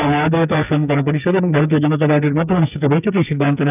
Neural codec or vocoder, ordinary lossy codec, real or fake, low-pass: codec, 44.1 kHz, 2.6 kbps, SNAC; none; fake; 3.6 kHz